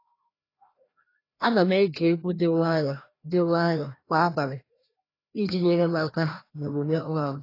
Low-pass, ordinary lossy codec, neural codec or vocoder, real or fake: 5.4 kHz; AAC, 24 kbps; codec, 16 kHz, 1 kbps, FreqCodec, larger model; fake